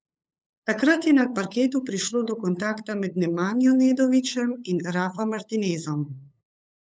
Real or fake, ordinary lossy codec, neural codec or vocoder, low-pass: fake; none; codec, 16 kHz, 8 kbps, FunCodec, trained on LibriTTS, 25 frames a second; none